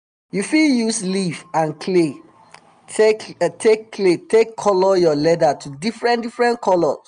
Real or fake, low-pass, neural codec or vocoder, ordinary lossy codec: real; 9.9 kHz; none; none